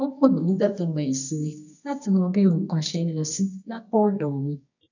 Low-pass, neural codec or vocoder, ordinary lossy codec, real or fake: 7.2 kHz; codec, 24 kHz, 0.9 kbps, WavTokenizer, medium music audio release; none; fake